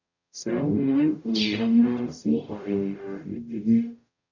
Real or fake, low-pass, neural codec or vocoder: fake; 7.2 kHz; codec, 44.1 kHz, 0.9 kbps, DAC